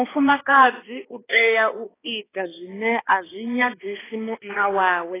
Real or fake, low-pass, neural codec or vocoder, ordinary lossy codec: fake; 3.6 kHz; codec, 16 kHz, 2 kbps, X-Codec, HuBERT features, trained on balanced general audio; AAC, 16 kbps